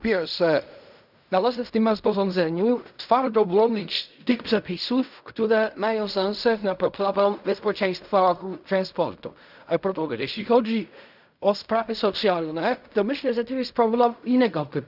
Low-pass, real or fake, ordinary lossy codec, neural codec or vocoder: 5.4 kHz; fake; none; codec, 16 kHz in and 24 kHz out, 0.4 kbps, LongCat-Audio-Codec, fine tuned four codebook decoder